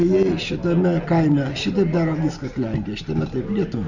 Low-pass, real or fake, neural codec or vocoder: 7.2 kHz; real; none